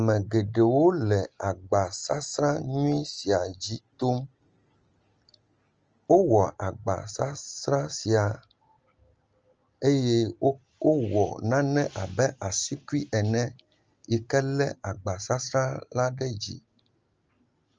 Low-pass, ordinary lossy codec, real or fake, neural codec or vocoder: 7.2 kHz; Opus, 24 kbps; real; none